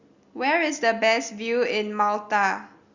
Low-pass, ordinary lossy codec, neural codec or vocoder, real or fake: 7.2 kHz; Opus, 64 kbps; none; real